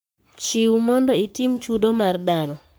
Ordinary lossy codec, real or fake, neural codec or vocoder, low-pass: none; fake; codec, 44.1 kHz, 3.4 kbps, Pupu-Codec; none